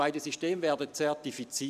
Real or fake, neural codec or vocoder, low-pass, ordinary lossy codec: fake; vocoder, 44.1 kHz, 128 mel bands every 512 samples, BigVGAN v2; 14.4 kHz; none